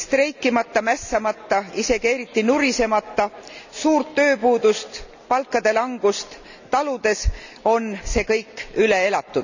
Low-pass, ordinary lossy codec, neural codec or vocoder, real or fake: 7.2 kHz; none; none; real